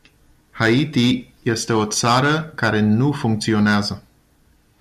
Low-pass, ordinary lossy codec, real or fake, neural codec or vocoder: 14.4 kHz; MP3, 96 kbps; real; none